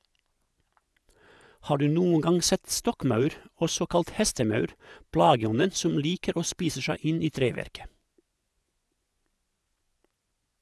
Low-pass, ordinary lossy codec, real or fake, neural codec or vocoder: none; none; fake; vocoder, 24 kHz, 100 mel bands, Vocos